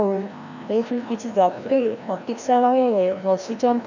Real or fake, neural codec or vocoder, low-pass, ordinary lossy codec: fake; codec, 16 kHz, 1 kbps, FreqCodec, larger model; 7.2 kHz; none